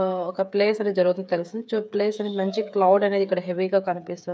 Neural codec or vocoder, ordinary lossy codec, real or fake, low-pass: codec, 16 kHz, 8 kbps, FreqCodec, smaller model; none; fake; none